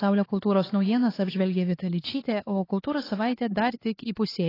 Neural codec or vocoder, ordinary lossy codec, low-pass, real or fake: codec, 16 kHz, 4 kbps, X-Codec, HuBERT features, trained on LibriSpeech; AAC, 24 kbps; 5.4 kHz; fake